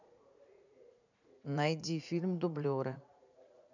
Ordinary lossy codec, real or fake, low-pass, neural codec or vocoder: none; fake; 7.2 kHz; vocoder, 44.1 kHz, 80 mel bands, Vocos